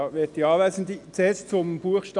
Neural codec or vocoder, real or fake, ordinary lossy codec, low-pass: none; real; MP3, 96 kbps; 10.8 kHz